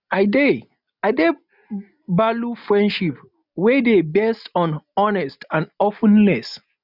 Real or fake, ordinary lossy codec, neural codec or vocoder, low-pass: real; none; none; 5.4 kHz